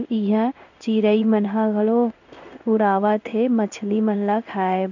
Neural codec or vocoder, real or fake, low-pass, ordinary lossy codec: codec, 16 kHz in and 24 kHz out, 1 kbps, XY-Tokenizer; fake; 7.2 kHz; none